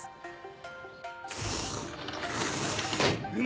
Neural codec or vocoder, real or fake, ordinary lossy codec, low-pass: none; real; none; none